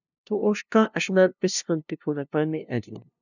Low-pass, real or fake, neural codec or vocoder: 7.2 kHz; fake; codec, 16 kHz, 0.5 kbps, FunCodec, trained on LibriTTS, 25 frames a second